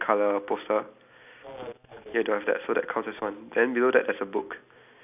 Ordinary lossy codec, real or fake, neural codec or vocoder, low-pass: none; real; none; 3.6 kHz